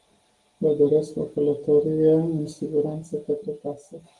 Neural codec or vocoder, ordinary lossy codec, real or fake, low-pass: none; Opus, 24 kbps; real; 10.8 kHz